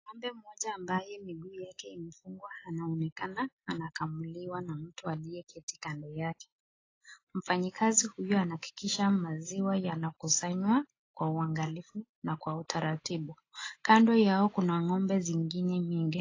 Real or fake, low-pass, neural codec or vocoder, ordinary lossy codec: real; 7.2 kHz; none; AAC, 32 kbps